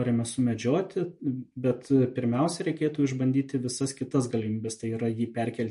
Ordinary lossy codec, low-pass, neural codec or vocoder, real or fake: MP3, 48 kbps; 14.4 kHz; none; real